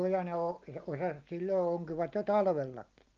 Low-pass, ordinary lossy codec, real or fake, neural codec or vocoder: 7.2 kHz; Opus, 24 kbps; real; none